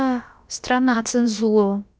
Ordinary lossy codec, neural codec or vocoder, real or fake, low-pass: none; codec, 16 kHz, about 1 kbps, DyCAST, with the encoder's durations; fake; none